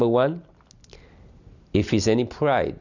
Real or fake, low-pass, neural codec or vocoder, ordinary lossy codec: real; 7.2 kHz; none; Opus, 64 kbps